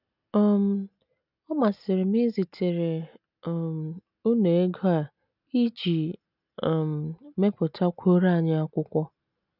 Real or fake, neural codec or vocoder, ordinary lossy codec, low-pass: real; none; none; 5.4 kHz